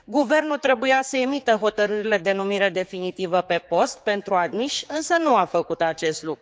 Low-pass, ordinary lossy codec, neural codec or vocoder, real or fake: none; none; codec, 16 kHz, 4 kbps, X-Codec, HuBERT features, trained on general audio; fake